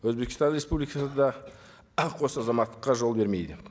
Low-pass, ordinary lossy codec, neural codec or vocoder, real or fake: none; none; none; real